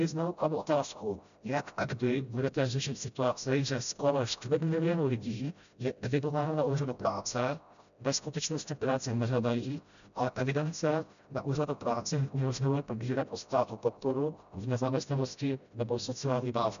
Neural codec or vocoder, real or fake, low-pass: codec, 16 kHz, 0.5 kbps, FreqCodec, smaller model; fake; 7.2 kHz